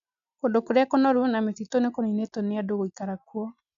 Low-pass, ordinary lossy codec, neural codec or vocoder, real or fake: 7.2 kHz; none; none; real